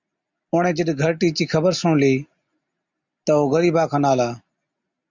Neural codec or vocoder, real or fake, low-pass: none; real; 7.2 kHz